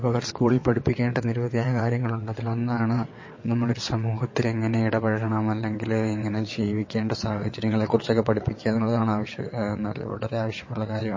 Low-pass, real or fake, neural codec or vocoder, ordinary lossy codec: 7.2 kHz; fake; vocoder, 22.05 kHz, 80 mel bands, WaveNeXt; MP3, 32 kbps